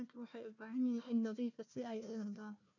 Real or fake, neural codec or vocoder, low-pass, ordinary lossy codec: fake; codec, 16 kHz, 1 kbps, FunCodec, trained on Chinese and English, 50 frames a second; 7.2 kHz; none